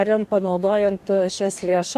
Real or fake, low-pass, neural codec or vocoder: fake; 14.4 kHz; codec, 44.1 kHz, 2.6 kbps, DAC